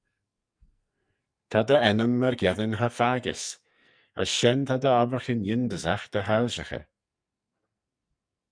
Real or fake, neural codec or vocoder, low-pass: fake; codec, 44.1 kHz, 2.6 kbps, SNAC; 9.9 kHz